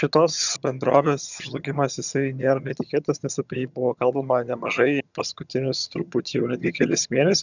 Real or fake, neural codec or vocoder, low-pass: fake; vocoder, 22.05 kHz, 80 mel bands, HiFi-GAN; 7.2 kHz